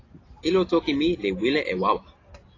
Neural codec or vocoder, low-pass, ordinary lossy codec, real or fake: none; 7.2 kHz; AAC, 32 kbps; real